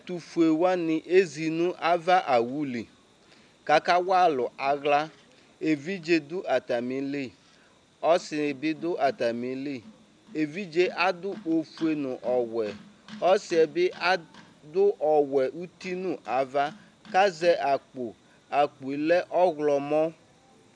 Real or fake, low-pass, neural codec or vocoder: real; 9.9 kHz; none